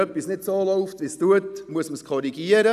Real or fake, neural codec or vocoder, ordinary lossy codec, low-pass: real; none; none; 14.4 kHz